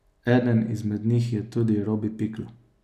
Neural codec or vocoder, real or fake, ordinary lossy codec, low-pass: none; real; none; 14.4 kHz